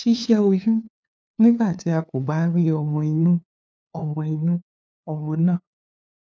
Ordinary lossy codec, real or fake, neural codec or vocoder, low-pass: none; fake; codec, 16 kHz, 2 kbps, FunCodec, trained on LibriTTS, 25 frames a second; none